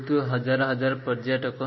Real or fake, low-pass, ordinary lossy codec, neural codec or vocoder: real; 7.2 kHz; MP3, 24 kbps; none